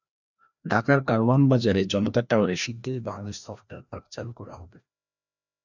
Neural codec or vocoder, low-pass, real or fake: codec, 16 kHz, 1 kbps, FreqCodec, larger model; 7.2 kHz; fake